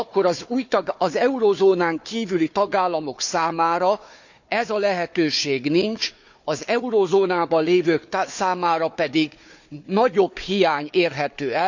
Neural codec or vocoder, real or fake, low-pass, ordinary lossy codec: codec, 16 kHz, 4 kbps, FunCodec, trained on Chinese and English, 50 frames a second; fake; 7.2 kHz; none